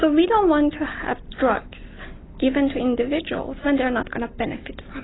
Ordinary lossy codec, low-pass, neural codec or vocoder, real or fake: AAC, 16 kbps; 7.2 kHz; none; real